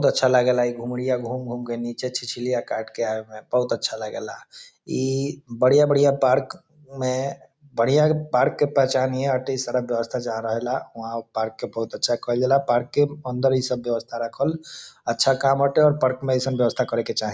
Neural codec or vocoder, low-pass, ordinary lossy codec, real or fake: none; none; none; real